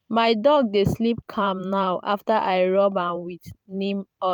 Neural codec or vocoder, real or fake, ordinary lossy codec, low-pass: vocoder, 44.1 kHz, 128 mel bands every 512 samples, BigVGAN v2; fake; none; 19.8 kHz